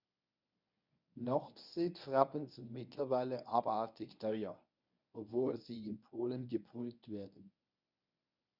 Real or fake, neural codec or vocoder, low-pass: fake; codec, 24 kHz, 0.9 kbps, WavTokenizer, medium speech release version 1; 5.4 kHz